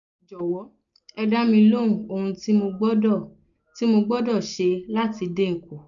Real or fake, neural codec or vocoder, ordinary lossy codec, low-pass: real; none; none; 7.2 kHz